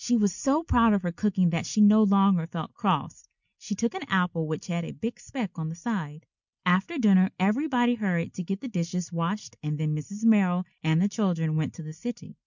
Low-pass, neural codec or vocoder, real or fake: 7.2 kHz; none; real